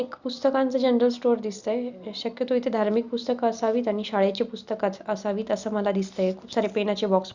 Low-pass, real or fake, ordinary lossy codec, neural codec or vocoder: 7.2 kHz; real; Opus, 64 kbps; none